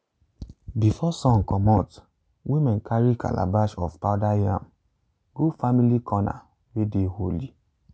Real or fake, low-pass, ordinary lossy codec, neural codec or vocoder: real; none; none; none